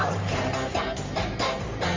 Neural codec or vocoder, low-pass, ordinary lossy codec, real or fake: codec, 44.1 kHz, 3.4 kbps, Pupu-Codec; 7.2 kHz; Opus, 32 kbps; fake